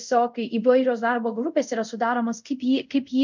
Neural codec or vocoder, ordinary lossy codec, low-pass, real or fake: codec, 24 kHz, 0.5 kbps, DualCodec; MP3, 64 kbps; 7.2 kHz; fake